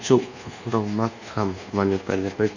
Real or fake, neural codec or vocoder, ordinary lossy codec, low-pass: fake; codec, 24 kHz, 1.2 kbps, DualCodec; none; 7.2 kHz